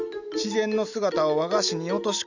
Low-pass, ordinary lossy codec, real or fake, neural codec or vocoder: 7.2 kHz; none; real; none